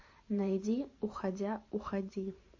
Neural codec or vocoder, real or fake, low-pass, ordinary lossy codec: vocoder, 44.1 kHz, 128 mel bands every 512 samples, BigVGAN v2; fake; 7.2 kHz; MP3, 32 kbps